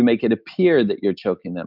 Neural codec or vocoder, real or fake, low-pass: none; real; 5.4 kHz